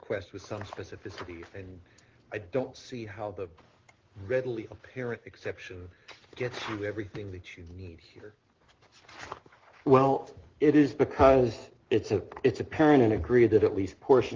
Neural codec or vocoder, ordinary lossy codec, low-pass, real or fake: none; Opus, 16 kbps; 7.2 kHz; real